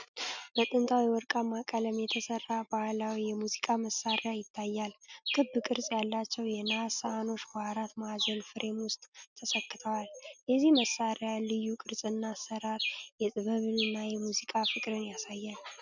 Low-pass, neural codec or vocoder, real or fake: 7.2 kHz; none; real